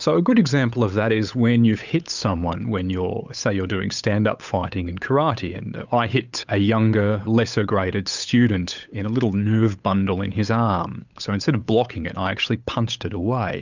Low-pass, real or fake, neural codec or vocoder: 7.2 kHz; fake; codec, 16 kHz, 8 kbps, FunCodec, trained on Chinese and English, 25 frames a second